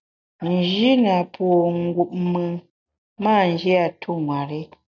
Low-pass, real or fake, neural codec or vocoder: 7.2 kHz; real; none